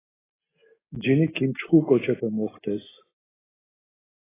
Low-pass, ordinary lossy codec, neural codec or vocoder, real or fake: 3.6 kHz; AAC, 16 kbps; none; real